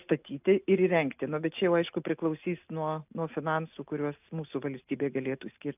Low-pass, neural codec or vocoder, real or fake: 3.6 kHz; none; real